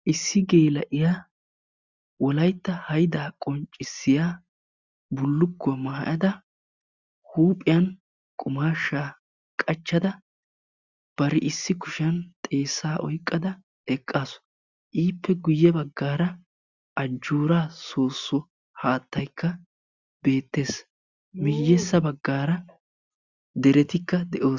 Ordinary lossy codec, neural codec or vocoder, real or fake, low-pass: Opus, 64 kbps; none; real; 7.2 kHz